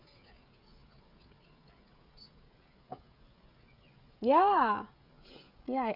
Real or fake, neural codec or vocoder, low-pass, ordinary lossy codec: fake; codec, 16 kHz, 16 kbps, FreqCodec, smaller model; 5.4 kHz; none